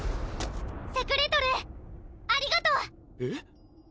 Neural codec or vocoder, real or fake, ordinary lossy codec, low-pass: none; real; none; none